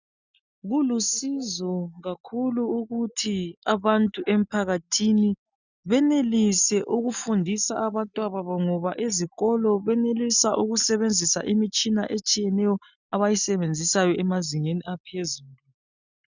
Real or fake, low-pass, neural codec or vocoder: real; 7.2 kHz; none